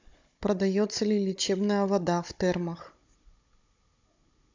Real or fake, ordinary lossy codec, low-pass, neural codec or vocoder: fake; MP3, 64 kbps; 7.2 kHz; codec, 16 kHz, 16 kbps, FunCodec, trained on Chinese and English, 50 frames a second